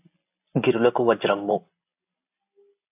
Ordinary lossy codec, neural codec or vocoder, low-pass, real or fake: AAC, 32 kbps; none; 3.6 kHz; real